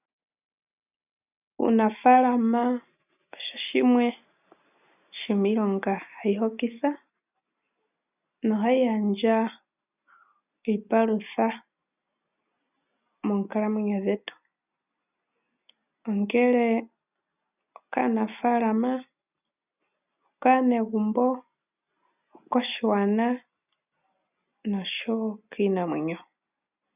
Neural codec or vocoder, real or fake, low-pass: none; real; 3.6 kHz